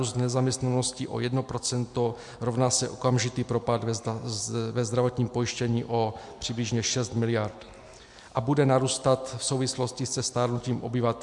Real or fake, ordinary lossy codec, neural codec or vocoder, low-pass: real; MP3, 64 kbps; none; 10.8 kHz